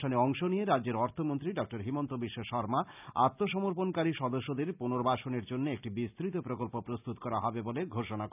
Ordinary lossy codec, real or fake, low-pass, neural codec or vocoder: none; real; 3.6 kHz; none